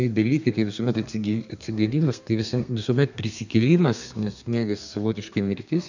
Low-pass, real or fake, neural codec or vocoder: 7.2 kHz; fake; codec, 32 kHz, 1.9 kbps, SNAC